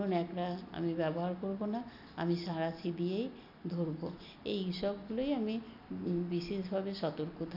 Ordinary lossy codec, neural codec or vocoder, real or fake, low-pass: none; none; real; 5.4 kHz